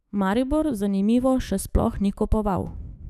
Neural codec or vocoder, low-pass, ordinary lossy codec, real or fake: autoencoder, 48 kHz, 128 numbers a frame, DAC-VAE, trained on Japanese speech; 14.4 kHz; none; fake